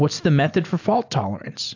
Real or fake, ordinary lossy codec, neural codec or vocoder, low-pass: real; AAC, 48 kbps; none; 7.2 kHz